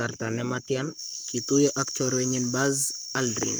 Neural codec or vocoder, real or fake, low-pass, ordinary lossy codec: codec, 44.1 kHz, 7.8 kbps, Pupu-Codec; fake; none; none